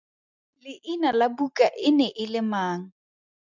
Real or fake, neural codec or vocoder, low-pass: real; none; 7.2 kHz